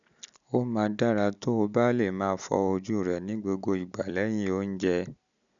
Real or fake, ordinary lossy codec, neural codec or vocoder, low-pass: real; none; none; 7.2 kHz